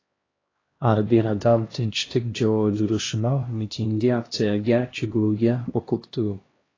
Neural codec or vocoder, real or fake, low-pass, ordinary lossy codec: codec, 16 kHz, 1 kbps, X-Codec, HuBERT features, trained on LibriSpeech; fake; 7.2 kHz; AAC, 32 kbps